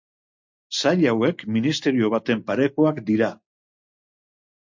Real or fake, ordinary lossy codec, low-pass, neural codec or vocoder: real; MP3, 48 kbps; 7.2 kHz; none